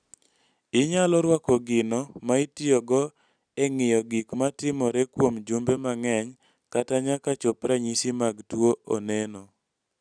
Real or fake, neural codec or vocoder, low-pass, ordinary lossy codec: real; none; 9.9 kHz; none